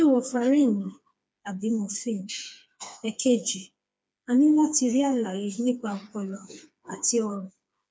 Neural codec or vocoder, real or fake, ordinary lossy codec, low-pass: codec, 16 kHz, 4 kbps, FreqCodec, smaller model; fake; none; none